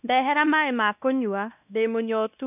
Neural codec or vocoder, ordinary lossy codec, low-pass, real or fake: codec, 16 kHz, 1 kbps, X-Codec, WavLM features, trained on Multilingual LibriSpeech; none; 3.6 kHz; fake